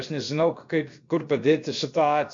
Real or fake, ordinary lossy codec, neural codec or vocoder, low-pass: fake; MP3, 48 kbps; codec, 16 kHz, about 1 kbps, DyCAST, with the encoder's durations; 7.2 kHz